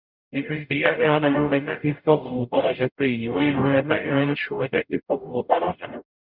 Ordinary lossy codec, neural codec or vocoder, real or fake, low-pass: Opus, 64 kbps; codec, 44.1 kHz, 0.9 kbps, DAC; fake; 5.4 kHz